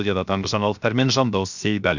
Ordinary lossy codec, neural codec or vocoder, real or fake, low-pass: none; codec, 16 kHz, 0.7 kbps, FocalCodec; fake; 7.2 kHz